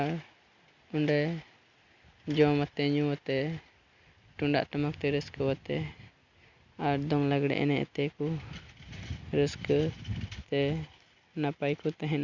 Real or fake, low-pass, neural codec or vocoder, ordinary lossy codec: real; 7.2 kHz; none; Opus, 64 kbps